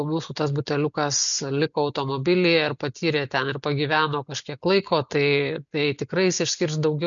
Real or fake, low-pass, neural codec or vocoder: real; 7.2 kHz; none